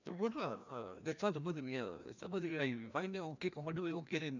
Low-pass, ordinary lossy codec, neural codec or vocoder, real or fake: 7.2 kHz; none; codec, 16 kHz, 1 kbps, FreqCodec, larger model; fake